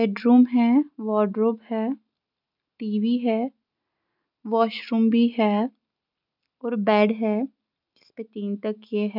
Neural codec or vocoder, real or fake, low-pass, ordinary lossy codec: none; real; 5.4 kHz; none